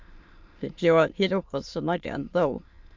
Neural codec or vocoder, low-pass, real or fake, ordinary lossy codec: autoencoder, 22.05 kHz, a latent of 192 numbers a frame, VITS, trained on many speakers; 7.2 kHz; fake; MP3, 64 kbps